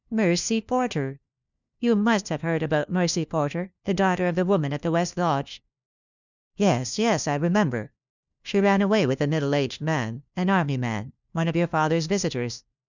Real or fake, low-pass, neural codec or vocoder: fake; 7.2 kHz; codec, 16 kHz, 1 kbps, FunCodec, trained on LibriTTS, 50 frames a second